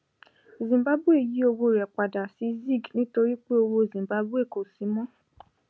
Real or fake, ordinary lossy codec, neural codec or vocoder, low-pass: real; none; none; none